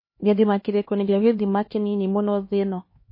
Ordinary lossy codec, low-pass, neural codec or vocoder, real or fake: MP3, 24 kbps; 5.4 kHz; codec, 16 kHz, 2 kbps, X-Codec, HuBERT features, trained on LibriSpeech; fake